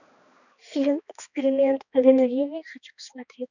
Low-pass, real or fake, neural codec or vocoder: 7.2 kHz; fake; codec, 16 kHz, 2 kbps, X-Codec, HuBERT features, trained on balanced general audio